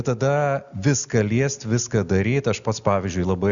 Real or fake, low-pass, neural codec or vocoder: real; 7.2 kHz; none